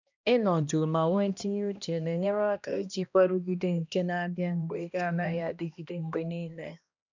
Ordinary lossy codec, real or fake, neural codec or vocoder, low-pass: none; fake; codec, 16 kHz, 1 kbps, X-Codec, HuBERT features, trained on balanced general audio; 7.2 kHz